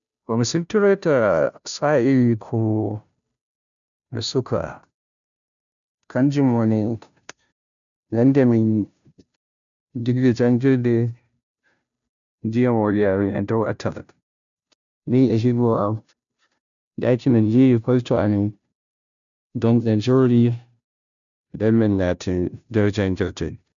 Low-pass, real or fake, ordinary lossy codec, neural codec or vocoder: 7.2 kHz; fake; none; codec, 16 kHz, 0.5 kbps, FunCodec, trained on Chinese and English, 25 frames a second